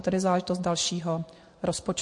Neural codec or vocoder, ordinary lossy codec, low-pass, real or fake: none; MP3, 48 kbps; 10.8 kHz; real